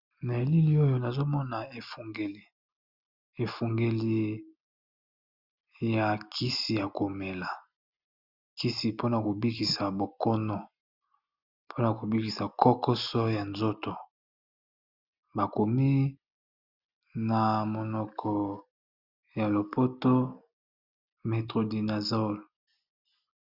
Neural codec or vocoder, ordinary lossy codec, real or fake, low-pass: none; Opus, 64 kbps; real; 5.4 kHz